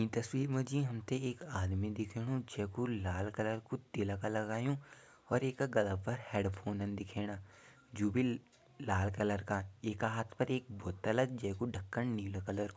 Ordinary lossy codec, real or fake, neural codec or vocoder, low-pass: none; real; none; none